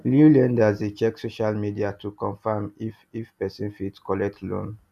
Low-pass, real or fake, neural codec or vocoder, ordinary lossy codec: 14.4 kHz; real; none; none